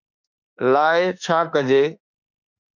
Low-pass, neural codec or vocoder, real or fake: 7.2 kHz; autoencoder, 48 kHz, 32 numbers a frame, DAC-VAE, trained on Japanese speech; fake